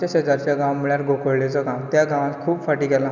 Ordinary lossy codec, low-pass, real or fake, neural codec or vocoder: none; 7.2 kHz; real; none